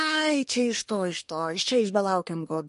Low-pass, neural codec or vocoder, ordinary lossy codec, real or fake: 10.8 kHz; codec, 24 kHz, 1 kbps, SNAC; MP3, 48 kbps; fake